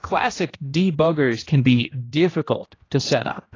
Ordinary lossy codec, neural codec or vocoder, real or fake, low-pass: AAC, 32 kbps; codec, 16 kHz, 1 kbps, X-Codec, HuBERT features, trained on general audio; fake; 7.2 kHz